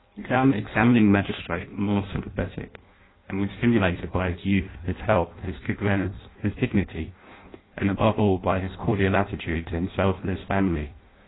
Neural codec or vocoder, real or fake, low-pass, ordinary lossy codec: codec, 16 kHz in and 24 kHz out, 0.6 kbps, FireRedTTS-2 codec; fake; 7.2 kHz; AAC, 16 kbps